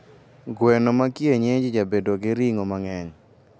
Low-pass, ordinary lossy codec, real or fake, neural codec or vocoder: none; none; real; none